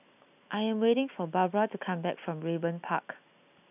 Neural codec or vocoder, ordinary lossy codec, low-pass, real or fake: none; none; 3.6 kHz; real